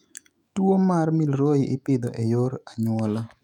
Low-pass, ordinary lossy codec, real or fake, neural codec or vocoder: 19.8 kHz; none; fake; autoencoder, 48 kHz, 128 numbers a frame, DAC-VAE, trained on Japanese speech